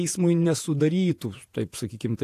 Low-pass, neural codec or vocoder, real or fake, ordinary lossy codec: 14.4 kHz; vocoder, 48 kHz, 128 mel bands, Vocos; fake; AAC, 64 kbps